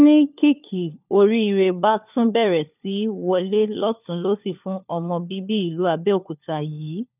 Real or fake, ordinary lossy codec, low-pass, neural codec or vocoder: fake; none; 3.6 kHz; codec, 16 kHz, 4 kbps, FunCodec, trained on LibriTTS, 50 frames a second